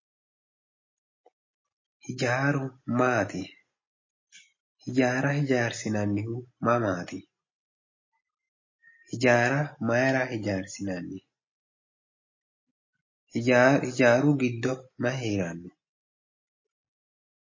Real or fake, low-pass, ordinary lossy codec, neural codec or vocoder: real; 7.2 kHz; MP3, 32 kbps; none